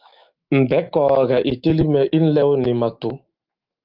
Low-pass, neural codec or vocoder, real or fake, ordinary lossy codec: 5.4 kHz; autoencoder, 48 kHz, 128 numbers a frame, DAC-VAE, trained on Japanese speech; fake; Opus, 32 kbps